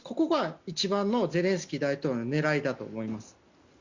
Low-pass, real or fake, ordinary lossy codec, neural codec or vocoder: 7.2 kHz; real; Opus, 64 kbps; none